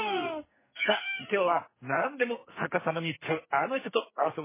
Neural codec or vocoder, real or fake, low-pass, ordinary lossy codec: codec, 44.1 kHz, 2.6 kbps, SNAC; fake; 3.6 kHz; MP3, 16 kbps